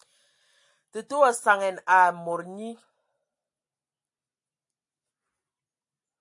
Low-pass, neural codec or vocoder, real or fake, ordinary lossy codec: 10.8 kHz; none; real; AAC, 64 kbps